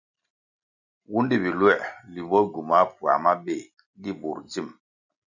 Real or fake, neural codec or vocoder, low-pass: real; none; 7.2 kHz